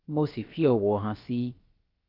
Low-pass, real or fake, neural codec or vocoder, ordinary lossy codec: 5.4 kHz; fake; codec, 16 kHz, about 1 kbps, DyCAST, with the encoder's durations; Opus, 32 kbps